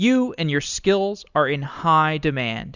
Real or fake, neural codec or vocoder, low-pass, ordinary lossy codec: real; none; 7.2 kHz; Opus, 64 kbps